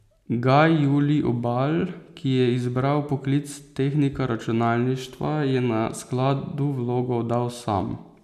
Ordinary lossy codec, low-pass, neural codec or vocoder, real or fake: none; 14.4 kHz; none; real